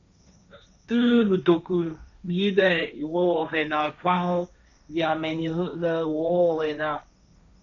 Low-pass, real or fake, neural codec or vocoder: 7.2 kHz; fake; codec, 16 kHz, 1.1 kbps, Voila-Tokenizer